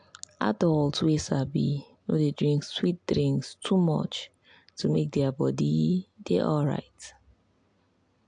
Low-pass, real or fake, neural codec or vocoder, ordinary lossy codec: 9.9 kHz; real; none; AAC, 64 kbps